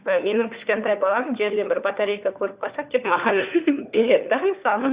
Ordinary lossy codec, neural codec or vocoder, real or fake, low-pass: Opus, 64 kbps; codec, 16 kHz, 4 kbps, FunCodec, trained on LibriTTS, 50 frames a second; fake; 3.6 kHz